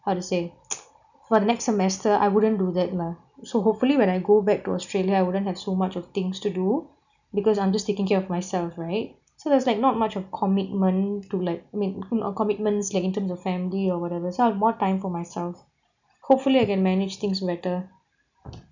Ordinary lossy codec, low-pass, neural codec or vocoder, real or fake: none; 7.2 kHz; none; real